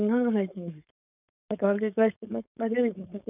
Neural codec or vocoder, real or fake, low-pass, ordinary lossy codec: codec, 16 kHz, 4.8 kbps, FACodec; fake; 3.6 kHz; none